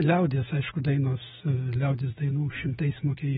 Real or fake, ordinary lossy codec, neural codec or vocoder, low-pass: fake; AAC, 16 kbps; autoencoder, 48 kHz, 128 numbers a frame, DAC-VAE, trained on Japanese speech; 19.8 kHz